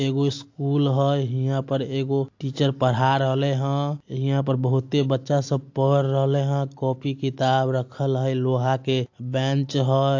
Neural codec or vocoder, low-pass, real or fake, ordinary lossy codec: none; 7.2 kHz; real; AAC, 48 kbps